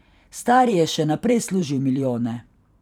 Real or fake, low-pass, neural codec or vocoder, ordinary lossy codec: fake; 19.8 kHz; vocoder, 44.1 kHz, 128 mel bands every 512 samples, BigVGAN v2; none